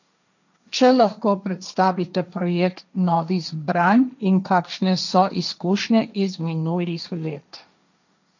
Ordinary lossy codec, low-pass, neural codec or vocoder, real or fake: none; 7.2 kHz; codec, 16 kHz, 1.1 kbps, Voila-Tokenizer; fake